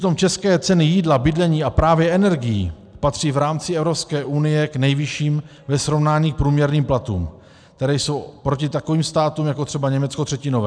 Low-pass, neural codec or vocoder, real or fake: 9.9 kHz; none; real